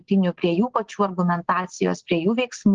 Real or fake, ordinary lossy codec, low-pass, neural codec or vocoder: real; Opus, 16 kbps; 7.2 kHz; none